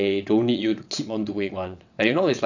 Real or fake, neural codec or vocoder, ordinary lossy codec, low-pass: fake; vocoder, 22.05 kHz, 80 mel bands, WaveNeXt; none; 7.2 kHz